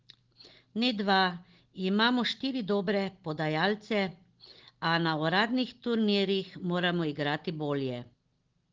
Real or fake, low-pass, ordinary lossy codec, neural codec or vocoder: real; 7.2 kHz; Opus, 16 kbps; none